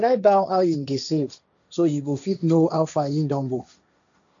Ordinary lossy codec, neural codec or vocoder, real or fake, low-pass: none; codec, 16 kHz, 1.1 kbps, Voila-Tokenizer; fake; 7.2 kHz